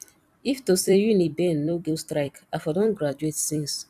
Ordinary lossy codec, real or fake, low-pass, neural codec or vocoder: none; fake; 14.4 kHz; vocoder, 44.1 kHz, 128 mel bands every 256 samples, BigVGAN v2